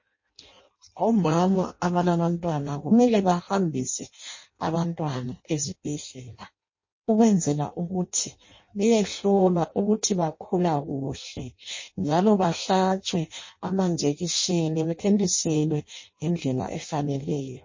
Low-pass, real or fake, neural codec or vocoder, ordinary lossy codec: 7.2 kHz; fake; codec, 16 kHz in and 24 kHz out, 0.6 kbps, FireRedTTS-2 codec; MP3, 32 kbps